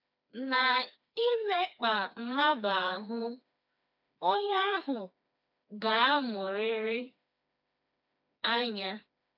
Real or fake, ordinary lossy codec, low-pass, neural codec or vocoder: fake; AAC, 48 kbps; 5.4 kHz; codec, 16 kHz, 2 kbps, FreqCodec, smaller model